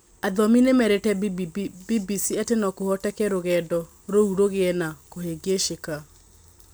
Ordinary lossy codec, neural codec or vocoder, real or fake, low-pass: none; none; real; none